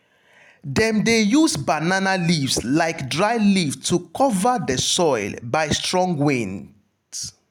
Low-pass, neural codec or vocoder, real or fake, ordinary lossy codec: 19.8 kHz; none; real; none